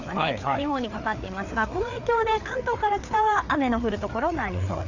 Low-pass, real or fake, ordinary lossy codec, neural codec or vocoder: 7.2 kHz; fake; none; codec, 16 kHz, 4 kbps, FreqCodec, larger model